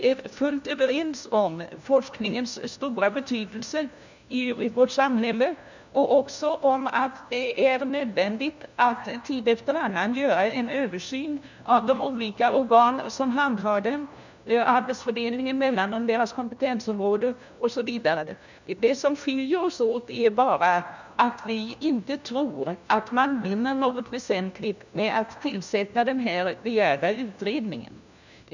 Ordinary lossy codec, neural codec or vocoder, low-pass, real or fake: none; codec, 16 kHz, 1 kbps, FunCodec, trained on LibriTTS, 50 frames a second; 7.2 kHz; fake